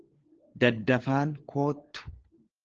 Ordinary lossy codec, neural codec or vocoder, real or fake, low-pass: Opus, 16 kbps; codec, 16 kHz, 4 kbps, FunCodec, trained on LibriTTS, 50 frames a second; fake; 7.2 kHz